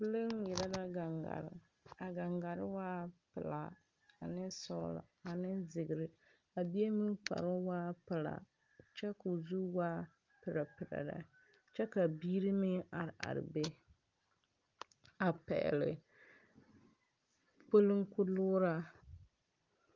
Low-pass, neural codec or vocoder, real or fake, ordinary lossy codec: 7.2 kHz; none; real; Opus, 32 kbps